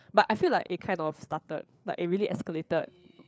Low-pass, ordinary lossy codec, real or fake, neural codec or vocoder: none; none; fake; codec, 16 kHz, 8 kbps, FreqCodec, larger model